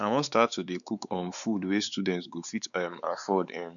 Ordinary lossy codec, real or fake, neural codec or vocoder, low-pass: none; fake; codec, 16 kHz, 4 kbps, X-Codec, WavLM features, trained on Multilingual LibriSpeech; 7.2 kHz